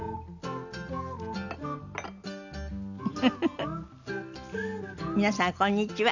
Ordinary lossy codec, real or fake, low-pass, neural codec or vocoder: none; real; 7.2 kHz; none